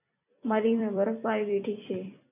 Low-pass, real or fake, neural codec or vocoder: 3.6 kHz; fake; vocoder, 44.1 kHz, 128 mel bands every 256 samples, BigVGAN v2